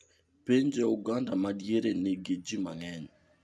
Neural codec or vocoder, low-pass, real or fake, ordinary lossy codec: none; none; real; none